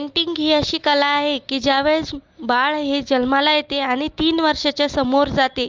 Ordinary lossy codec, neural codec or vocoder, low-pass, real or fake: Opus, 24 kbps; none; 7.2 kHz; real